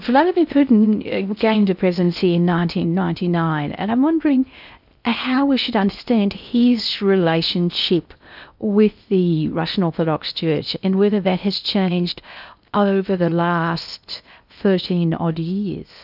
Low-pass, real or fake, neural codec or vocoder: 5.4 kHz; fake; codec, 16 kHz in and 24 kHz out, 0.6 kbps, FocalCodec, streaming, 4096 codes